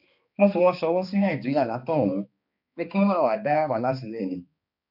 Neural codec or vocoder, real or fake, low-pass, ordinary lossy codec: codec, 16 kHz, 2 kbps, X-Codec, HuBERT features, trained on balanced general audio; fake; 5.4 kHz; none